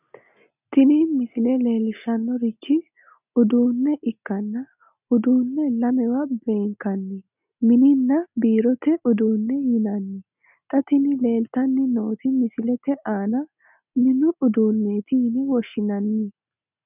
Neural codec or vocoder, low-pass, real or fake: none; 3.6 kHz; real